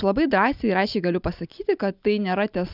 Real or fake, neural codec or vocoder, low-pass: real; none; 5.4 kHz